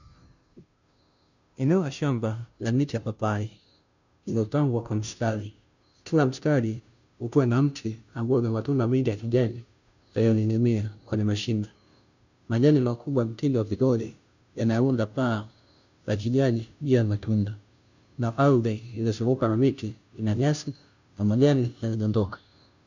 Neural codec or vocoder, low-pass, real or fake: codec, 16 kHz, 0.5 kbps, FunCodec, trained on Chinese and English, 25 frames a second; 7.2 kHz; fake